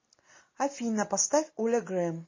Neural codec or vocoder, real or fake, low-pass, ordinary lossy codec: none; real; 7.2 kHz; MP3, 32 kbps